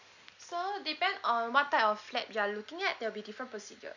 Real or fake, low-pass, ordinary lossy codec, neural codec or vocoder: real; 7.2 kHz; none; none